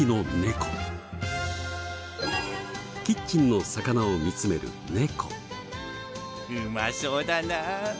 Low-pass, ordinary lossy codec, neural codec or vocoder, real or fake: none; none; none; real